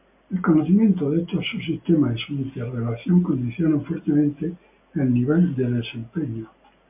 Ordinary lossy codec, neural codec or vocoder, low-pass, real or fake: MP3, 32 kbps; none; 3.6 kHz; real